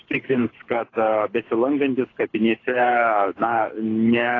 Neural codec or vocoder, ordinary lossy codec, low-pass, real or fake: codec, 24 kHz, 6 kbps, HILCodec; AAC, 32 kbps; 7.2 kHz; fake